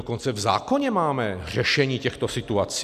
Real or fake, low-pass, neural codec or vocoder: real; 14.4 kHz; none